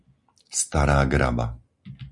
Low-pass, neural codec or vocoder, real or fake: 10.8 kHz; none; real